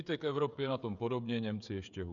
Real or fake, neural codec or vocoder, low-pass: fake; codec, 16 kHz, 16 kbps, FreqCodec, smaller model; 7.2 kHz